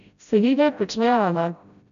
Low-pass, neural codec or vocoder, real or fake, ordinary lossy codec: 7.2 kHz; codec, 16 kHz, 0.5 kbps, FreqCodec, smaller model; fake; none